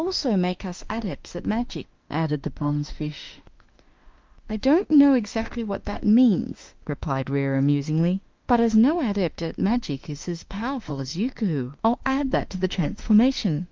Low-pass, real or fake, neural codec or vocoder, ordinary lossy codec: 7.2 kHz; fake; autoencoder, 48 kHz, 32 numbers a frame, DAC-VAE, trained on Japanese speech; Opus, 24 kbps